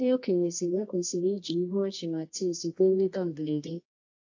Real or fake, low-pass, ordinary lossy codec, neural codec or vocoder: fake; 7.2 kHz; AAC, 48 kbps; codec, 24 kHz, 0.9 kbps, WavTokenizer, medium music audio release